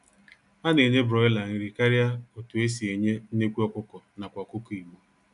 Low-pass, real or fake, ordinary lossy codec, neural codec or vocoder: 10.8 kHz; real; none; none